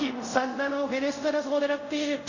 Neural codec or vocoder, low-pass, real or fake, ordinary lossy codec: codec, 24 kHz, 0.5 kbps, DualCodec; 7.2 kHz; fake; none